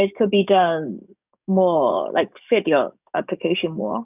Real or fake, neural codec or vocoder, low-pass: fake; codec, 44.1 kHz, 7.8 kbps, DAC; 3.6 kHz